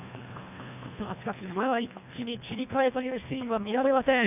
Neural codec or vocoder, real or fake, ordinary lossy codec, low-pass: codec, 24 kHz, 1.5 kbps, HILCodec; fake; none; 3.6 kHz